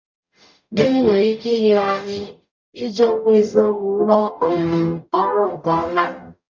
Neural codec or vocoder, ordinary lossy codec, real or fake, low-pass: codec, 44.1 kHz, 0.9 kbps, DAC; MP3, 64 kbps; fake; 7.2 kHz